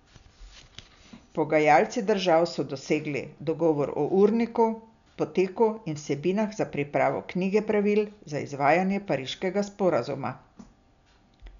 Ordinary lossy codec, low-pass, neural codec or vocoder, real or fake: none; 7.2 kHz; none; real